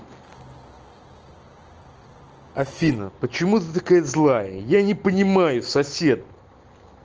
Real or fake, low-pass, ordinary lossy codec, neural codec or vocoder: real; 7.2 kHz; Opus, 16 kbps; none